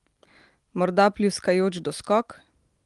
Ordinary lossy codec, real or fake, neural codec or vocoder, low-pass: Opus, 24 kbps; real; none; 10.8 kHz